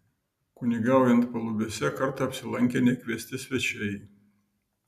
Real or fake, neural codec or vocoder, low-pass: real; none; 14.4 kHz